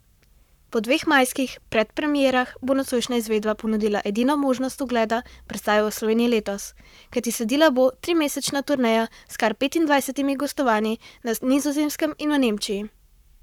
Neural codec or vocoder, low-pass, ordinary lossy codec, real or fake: codec, 44.1 kHz, 7.8 kbps, Pupu-Codec; 19.8 kHz; none; fake